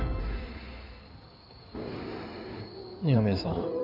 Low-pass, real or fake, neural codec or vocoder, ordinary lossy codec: 5.4 kHz; fake; codec, 16 kHz in and 24 kHz out, 2.2 kbps, FireRedTTS-2 codec; none